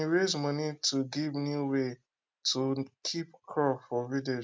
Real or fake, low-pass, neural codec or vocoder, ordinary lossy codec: real; none; none; none